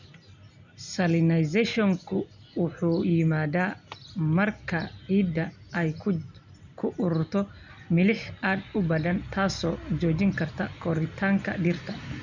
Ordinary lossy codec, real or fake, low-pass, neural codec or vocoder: none; real; 7.2 kHz; none